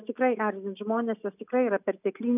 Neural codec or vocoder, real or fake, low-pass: none; real; 3.6 kHz